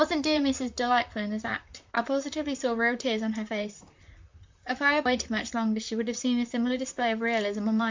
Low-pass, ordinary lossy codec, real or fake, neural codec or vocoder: 7.2 kHz; MP3, 64 kbps; fake; vocoder, 44.1 kHz, 128 mel bands, Pupu-Vocoder